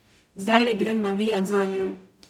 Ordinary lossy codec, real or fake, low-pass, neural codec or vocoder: none; fake; 19.8 kHz; codec, 44.1 kHz, 0.9 kbps, DAC